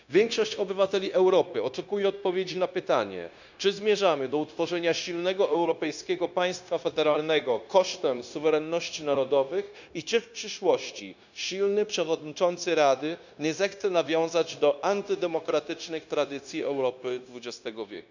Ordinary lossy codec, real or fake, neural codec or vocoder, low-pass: none; fake; codec, 16 kHz, 0.9 kbps, LongCat-Audio-Codec; 7.2 kHz